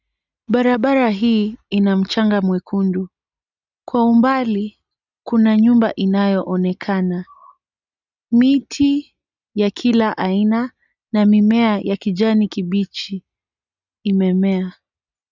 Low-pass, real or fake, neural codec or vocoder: 7.2 kHz; real; none